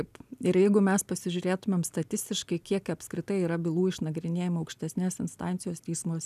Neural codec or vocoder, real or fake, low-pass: none; real; 14.4 kHz